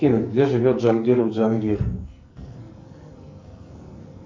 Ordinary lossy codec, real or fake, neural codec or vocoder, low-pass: MP3, 48 kbps; fake; codec, 44.1 kHz, 2.6 kbps, SNAC; 7.2 kHz